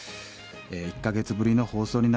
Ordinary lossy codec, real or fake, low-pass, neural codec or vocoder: none; real; none; none